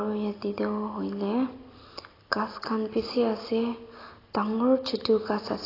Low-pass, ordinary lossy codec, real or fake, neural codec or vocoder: 5.4 kHz; AAC, 24 kbps; real; none